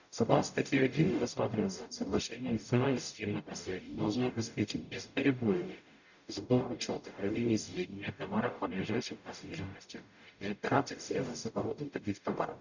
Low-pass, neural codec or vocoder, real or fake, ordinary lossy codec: 7.2 kHz; codec, 44.1 kHz, 0.9 kbps, DAC; fake; none